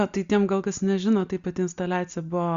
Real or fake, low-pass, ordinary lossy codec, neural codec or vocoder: real; 7.2 kHz; Opus, 64 kbps; none